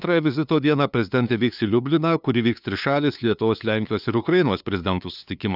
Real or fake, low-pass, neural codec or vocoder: fake; 5.4 kHz; codec, 16 kHz, 2 kbps, FunCodec, trained on LibriTTS, 25 frames a second